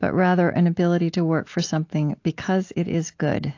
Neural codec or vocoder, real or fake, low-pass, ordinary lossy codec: none; real; 7.2 kHz; AAC, 48 kbps